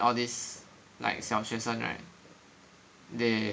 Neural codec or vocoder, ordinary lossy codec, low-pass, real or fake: none; none; none; real